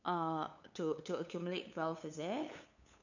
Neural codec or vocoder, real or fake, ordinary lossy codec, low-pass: codec, 16 kHz, 8 kbps, FunCodec, trained on LibriTTS, 25 frames a second; fake; none; 7.2 kHz